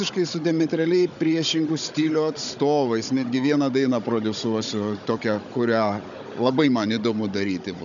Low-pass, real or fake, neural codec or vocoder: 7.2 kHz; fake; codec, 16 kHz, 16 kbps, FunCodec, trained on Chinese and English, 50 frames a second